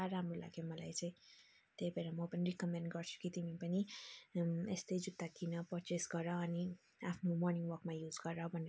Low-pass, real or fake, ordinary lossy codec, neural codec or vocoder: none; real; none; none